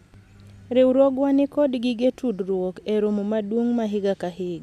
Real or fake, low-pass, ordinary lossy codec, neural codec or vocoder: real; 14.4 kHz; none; none